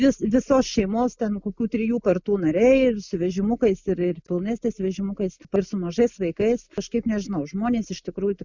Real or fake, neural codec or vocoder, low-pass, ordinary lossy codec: real; none; 7.2 kHz; Opus, 64 kbps